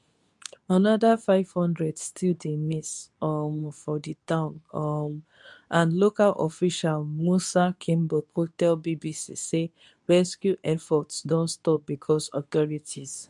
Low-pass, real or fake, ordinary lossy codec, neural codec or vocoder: 10.8 kHz; fake; none; codec, 24 kHz, 0.9 kbps, WavTokenizer, medium speech release version 2